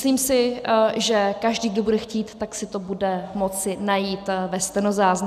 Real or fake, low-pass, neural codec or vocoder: real; 14.4 kHz; none